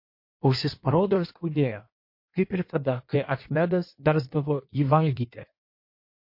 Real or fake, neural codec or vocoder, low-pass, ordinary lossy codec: fake; codec, 16 kHz in and 24 kHz out, 1.1 kbps, FireRedTTS-2 codec; 5.4 kHz; MP3, 32 kbps